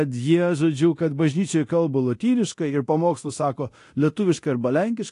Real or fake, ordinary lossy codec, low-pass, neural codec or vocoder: fake; AAC, 48 kbps; 10.8 kHz; codec, 24 kHz, 0.9 kbps, DualCodec